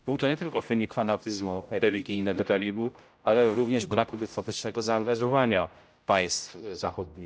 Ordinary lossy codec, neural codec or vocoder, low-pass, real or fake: none; codec, 16 kHz, 0.5 kbps, X-Codec, HuBERT features, trained on general audio; none; fake